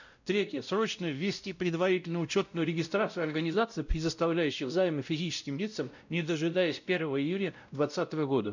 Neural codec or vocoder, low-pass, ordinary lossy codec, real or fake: codec, 16 kHz, 0.5 kbps, X-Codec, WavLM features, trained on Multilingual LibriSpeech; 7.2 kHz; none; fake